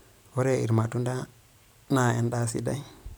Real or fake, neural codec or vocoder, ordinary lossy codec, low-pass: real; none; none; none